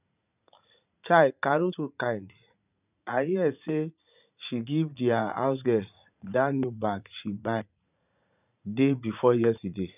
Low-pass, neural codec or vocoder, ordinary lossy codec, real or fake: 3.6 kHz; vocoder, 44.1 kHz, 80 mel bands, Vocos; none; fake